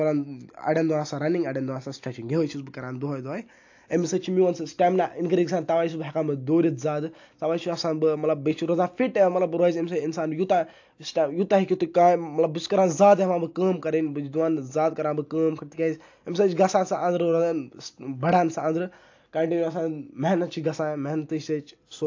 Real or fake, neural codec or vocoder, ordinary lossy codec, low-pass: real; none; AAC, 48 kbps; 7.2 kHz